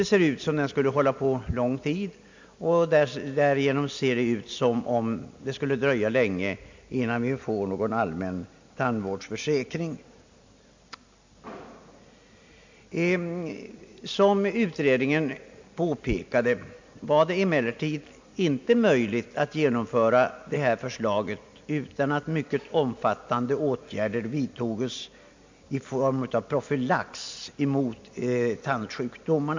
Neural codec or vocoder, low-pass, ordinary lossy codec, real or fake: none; 7.2 kHz; MP3, 64 kbps; real